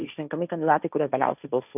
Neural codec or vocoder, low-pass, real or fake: codec, 16 kHz, 1.1 kbps, Voila-Tokenizer; 3.6 kHz; fake